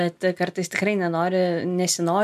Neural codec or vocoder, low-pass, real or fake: none; 14.4 kHz; real